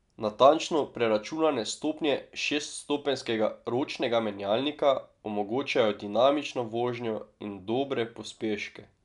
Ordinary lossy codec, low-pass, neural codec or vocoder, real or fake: none; 10.8 kHz; none; real